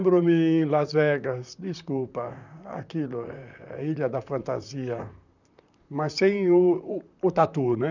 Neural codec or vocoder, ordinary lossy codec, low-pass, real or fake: vocoder, 44.1 kHz, 128 mel bands, Pupu-Vocoder; none; 7.2 kHz; fake